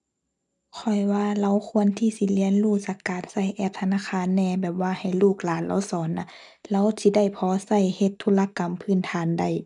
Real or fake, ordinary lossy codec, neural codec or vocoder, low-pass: real; none; none; 10.8 kHz